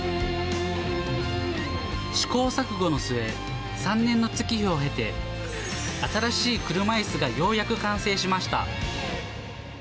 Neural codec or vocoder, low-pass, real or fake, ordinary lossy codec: none; none; real; none